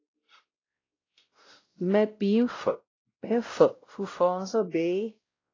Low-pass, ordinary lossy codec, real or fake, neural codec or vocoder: 7.2 kHz; AAC, 32 kbps; fake; codec, 16 kHz, 0.5 kbps, X-Codec, WavLM features, trained on Multilingual LibriSpeech